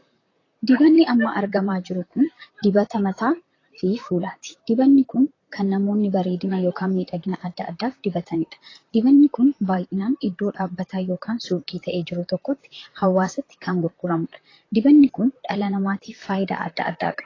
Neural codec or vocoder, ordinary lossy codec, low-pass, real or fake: vocoder, 44.1 kHz, 128 mel bands, Pupu-Vocoder; AAC, 32 kbps; 7.2 kHz; fake